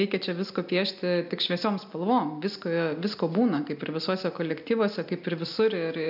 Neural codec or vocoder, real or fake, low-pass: none; real; 5.4 kHz